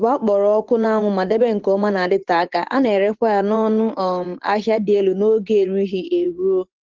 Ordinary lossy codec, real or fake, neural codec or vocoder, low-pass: Opus, 16 kbps; fake; vocoder, 24 kHz, 100 mel bands, Vocos; 7.2 kHz